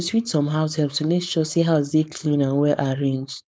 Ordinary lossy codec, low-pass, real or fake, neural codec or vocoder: none; none; fake; codec, 16 kHz, 4.8 kbps, FACodec